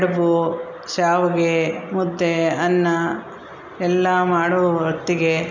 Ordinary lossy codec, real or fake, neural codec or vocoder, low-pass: none; real; none; 7.2 kHz